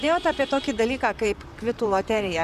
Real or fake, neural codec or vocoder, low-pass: fake; vocoder, 44.1 kHz, 128 mel bands, Pupu-Vocoder; 14.4 kHz